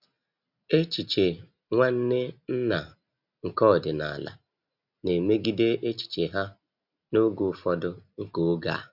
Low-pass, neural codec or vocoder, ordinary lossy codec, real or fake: 5.4 kHz; none; none; real